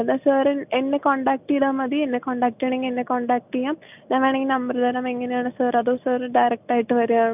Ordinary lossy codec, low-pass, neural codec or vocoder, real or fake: none; 3.6 kHz; none; real